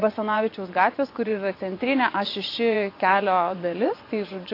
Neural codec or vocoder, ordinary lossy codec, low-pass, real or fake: vocoder, 24 kHz, 100 mel bands, Vocos; AAC, 32 kbps; 5.4 kHz; fake